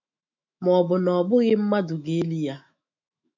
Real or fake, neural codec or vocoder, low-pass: fake; autoencoder, 48 kHz, 128 numbers a frame, DAC-VAE, trained on Japanese speech; 7.2 kHz